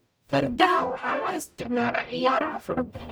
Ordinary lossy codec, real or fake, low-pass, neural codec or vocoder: none; fake; none; codec, 44.1 kHz, 0.9 kbps, DAC